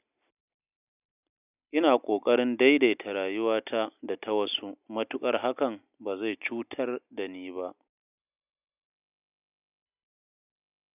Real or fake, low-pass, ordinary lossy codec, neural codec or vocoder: real; 3.6 kHz; none; none